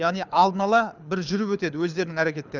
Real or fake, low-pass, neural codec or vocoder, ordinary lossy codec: fake; 7.2 kHz; codec, 24 kHz, 6 kbps, HILCodec; none